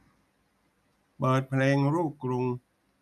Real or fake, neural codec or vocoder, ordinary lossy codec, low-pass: real; none; none; 14.4 kHz